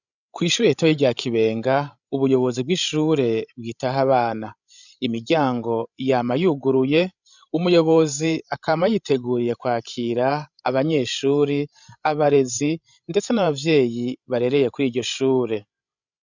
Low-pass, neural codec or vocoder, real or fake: 7.2 kHz; codec, 16 kHz, 16 kbps, FreqCodec, larger model; fake